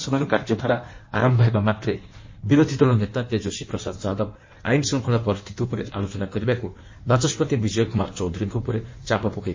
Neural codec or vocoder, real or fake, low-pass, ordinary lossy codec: codec, 16 kHz in and 24 kHz out, 1.1 kbps, FireRedTTS-2 codec; fake; 7.2 kHz; MP3, 32 kbps